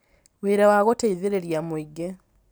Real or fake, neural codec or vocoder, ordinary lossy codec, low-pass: real; none; none; none